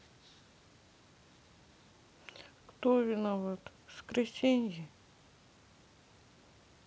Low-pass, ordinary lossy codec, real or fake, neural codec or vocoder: none; none; real; none